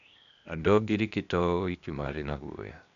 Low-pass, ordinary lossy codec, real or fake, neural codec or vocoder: 7.2 kHz; none; fake; codec, 16 kHz, 0.8 kbps, ZipCodec